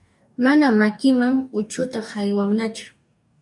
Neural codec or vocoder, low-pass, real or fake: codec, 44.1 kHz, 2.6 kbps, DAC; 10.8 kHz; fake